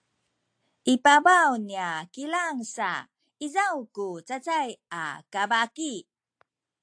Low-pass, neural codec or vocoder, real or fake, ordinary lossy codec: 9.9 kHz; none; real; AAC, 64 kbps